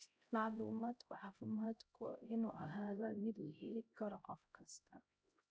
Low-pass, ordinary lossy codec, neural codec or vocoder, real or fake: none; none; codec, 16 kHz, 0.5 kbps, X-Codec, HuBERT features, trained on LibriSpeech; fake